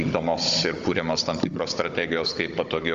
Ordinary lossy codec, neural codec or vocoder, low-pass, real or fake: Opus, 32 kbps; codec, 16 kHz, 16 kbps, FunCodec, trained on Chinese and English, 50 frames a second; 7.2 kHz; fake